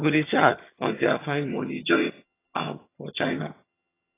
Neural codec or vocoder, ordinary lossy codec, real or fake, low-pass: vocoder, 22.05 kHz, 80 mel bands, HiFi-GAN; AAC, 16 kbps; fake; 3.6 kHz